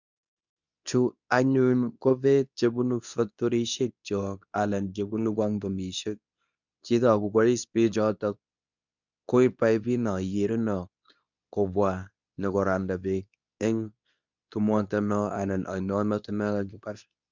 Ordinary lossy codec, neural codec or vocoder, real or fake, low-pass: none; codec, 24 kHz, 0.9 kbps, WavTokenizer, medium speech release version 2; fake; 7.2 kHz